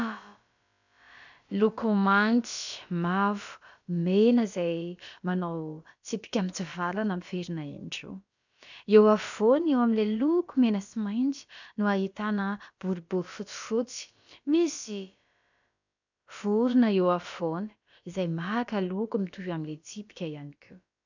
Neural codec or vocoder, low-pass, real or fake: codec, 16 kHz, about 1 kbps, DyCAST, with the encoder's durations; 7.2 kHz; fake